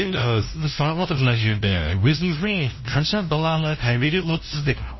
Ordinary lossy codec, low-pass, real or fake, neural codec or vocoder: MP3, 24 kbps; 7.2 kHz; fake; codec, 16 kHz, 0.5 kbps, FunCodec, trained on LibriTTS, 25 frames a second